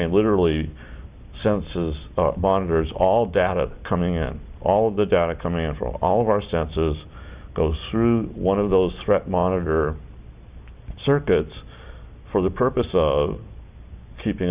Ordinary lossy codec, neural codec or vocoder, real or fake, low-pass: Opus, 64 kbps; none; real; 3.6 kHz